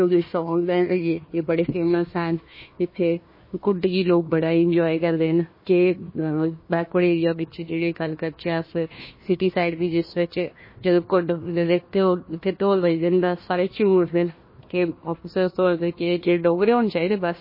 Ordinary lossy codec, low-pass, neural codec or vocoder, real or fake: MP3, 24 kbps; 5.4 kHz; codec, 16 kHz, 1 kbps, FunCodec, trained on Chinese and English, 50 frames a second; fake